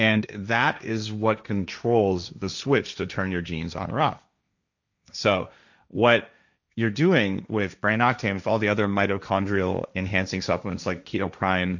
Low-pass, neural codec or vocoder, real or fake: 7.2 kHz; codec, 16 kHz, 1.1 kbps, Voila-Tokenizer; fake